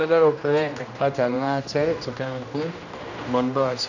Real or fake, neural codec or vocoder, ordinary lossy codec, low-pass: fake; codec, 16 kHz, 1 kbps, X-Codec, HuBERT features, trained on general audio; none; 7.2 kHz